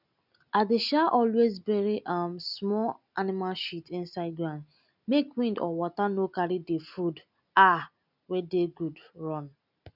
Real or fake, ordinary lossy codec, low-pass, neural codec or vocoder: real; none; 5.4 kHz; none